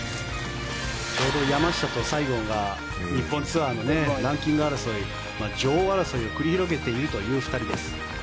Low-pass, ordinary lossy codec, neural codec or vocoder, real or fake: none; none; none; real